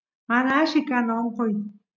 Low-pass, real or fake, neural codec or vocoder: 7.2 kHz; real; none